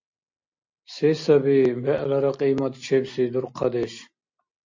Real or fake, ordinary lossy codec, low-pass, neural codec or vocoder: real; MP3, 48 kbps; 7.2 kHz; none